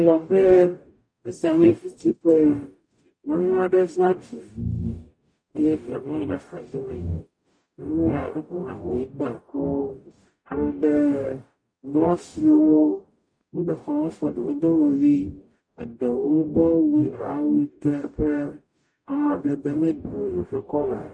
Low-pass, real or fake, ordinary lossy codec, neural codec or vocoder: 9.9 kHz; fake; MP3, 48 kbps; codec, 44.1 kHz, 0.9 kbps, DAC